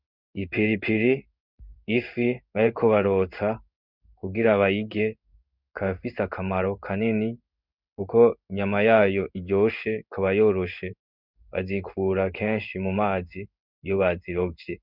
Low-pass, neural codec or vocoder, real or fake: 5.4 kHz; codec, 16 kHz in and 24 kHz out, 1 kbps, XY-Tokenizer; fake